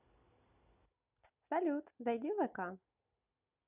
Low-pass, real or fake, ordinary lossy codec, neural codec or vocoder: 3.6 kHz; real; none; none